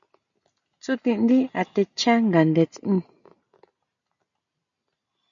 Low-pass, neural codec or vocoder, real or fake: 7.2 kHz; none; real